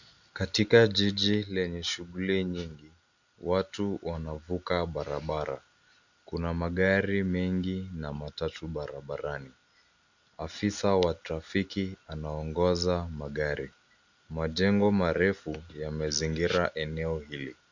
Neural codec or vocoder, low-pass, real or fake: none; 7.2 kHz; real